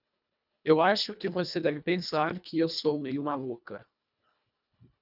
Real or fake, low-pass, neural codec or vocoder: fake; 5.4 kHz; codec, 24 kHz, 1.5 kbps, HILCodec